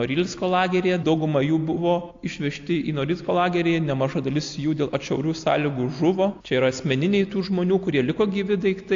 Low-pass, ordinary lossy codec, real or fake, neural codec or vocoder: 7.2 kHz; AAC, 48 kbps; real; none